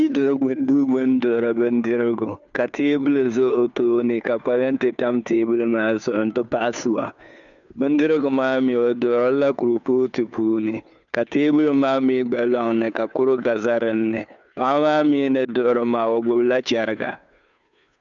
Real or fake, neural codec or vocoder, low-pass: fake; codec, 16 kHz, 2 kbps, FunCodec, trained on Chinese and English, 25 frames a second; 7.2 kHz